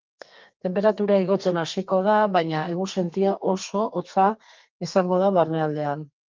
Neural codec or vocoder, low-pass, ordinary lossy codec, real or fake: codec, 32 kHz, 1.9 kbps, SNAC; 7.2 kHz; Opus, 32 kbps; fake